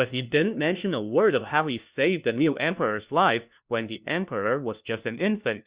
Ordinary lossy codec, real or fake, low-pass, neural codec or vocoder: Opus, 64 kbps; fake; 3.6 kHz; codec, 16 kHz, 1 kbps, FunCodec, trained on LibriTTS, 50 frames a second